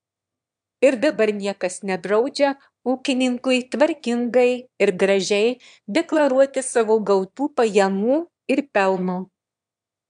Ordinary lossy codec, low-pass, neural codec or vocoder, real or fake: AAC, 96 kbps; 9.9 kHz; autoencoder, 22.05 kHz, a latent of 192 numbers a frame, VITS, trained on one speaker; fake